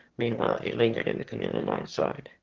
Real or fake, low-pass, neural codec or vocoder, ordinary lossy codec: fake; 7.2 kHz; autoencoder, 22.05 kHz, a latent of 192 numbers a frame, VITS, trained on one speaker; Opus, 16 kbps